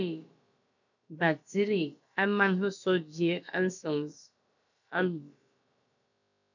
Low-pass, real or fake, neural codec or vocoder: 7.2 kHz; fake; codec, 16 kHz, about 1 kbps, DyCAST, with the encoder's durations